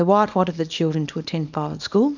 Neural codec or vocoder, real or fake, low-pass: codec, 24 kHz, 0.9 kbps, WavTokenizer, small release; fake; 7.2 kHz